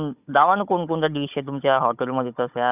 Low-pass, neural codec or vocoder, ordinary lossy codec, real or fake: 3.6 kHz; codec, 16 kHz, 16 kbps, FunCodec, trained on Chinese and English, 50 frames a second; none; fake